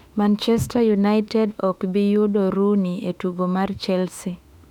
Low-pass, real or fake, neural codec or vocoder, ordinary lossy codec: 19.8 kHz; fake; autoencoder, 48 kHz, 32 numbers a frame, DAC-VAE, trained on Japanese speech; none